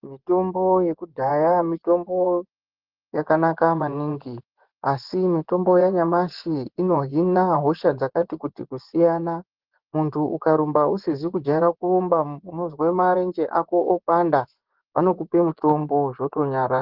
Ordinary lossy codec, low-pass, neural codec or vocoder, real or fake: Opus, 16 kbps; 5.4 kHz; vocoder, 24 kHz, 100 mel bands, Vocos; fake